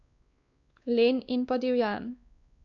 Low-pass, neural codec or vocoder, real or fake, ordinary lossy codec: 7.2 kHz; codec, 16 kHz, 2 kbps, X-Codec, WavLM features, trained on Multilingual LibriSpeech; fake; none